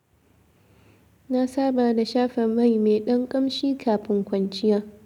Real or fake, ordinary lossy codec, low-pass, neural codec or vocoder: real; none; 19.8 kHz; none